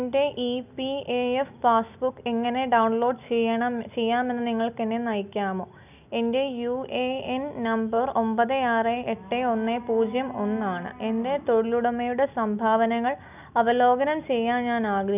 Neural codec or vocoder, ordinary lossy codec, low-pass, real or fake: none; none; 3.6 kHz; real